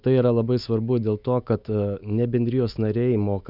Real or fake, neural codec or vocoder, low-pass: fake; autoencoder, 48 kHz, 128 numbers a frame, DAC-VAE, trained on Japanese speech; 5.4 kHz